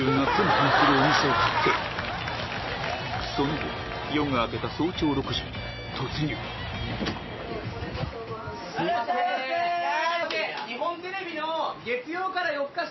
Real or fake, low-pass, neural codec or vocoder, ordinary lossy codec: real; 7.2 kHz; none; MP3, 24 kbps